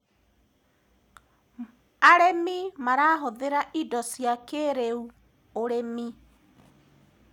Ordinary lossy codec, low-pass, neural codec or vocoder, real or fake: none; 19.8 kHz; none; real